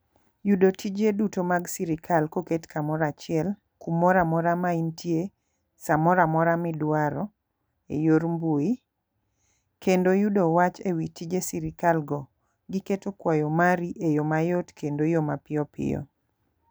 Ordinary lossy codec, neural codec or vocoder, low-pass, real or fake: none; none; none; real